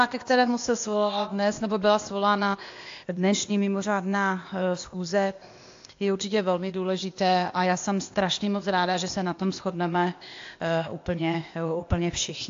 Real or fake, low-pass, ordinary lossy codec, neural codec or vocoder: fake; 7.2 kHz; AAC, 48 kbps; codec, 16 kHz, 0.8 kbps, ZipCodec